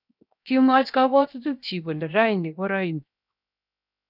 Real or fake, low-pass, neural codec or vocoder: fake; 5.4 kHz; codec, 16 kHz, 0.7 kbps, FocalCodec